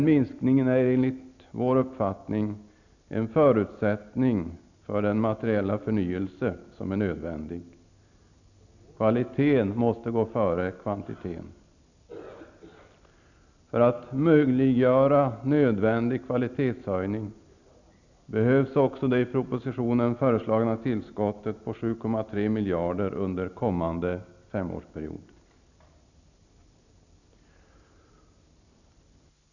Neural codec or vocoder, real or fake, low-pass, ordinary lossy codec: none; real; 7.2 kHz; none